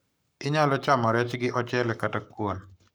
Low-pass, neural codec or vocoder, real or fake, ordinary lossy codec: none; codec, 44.1 kHz, 7.8 kbps, Pupu-Codec; fake; none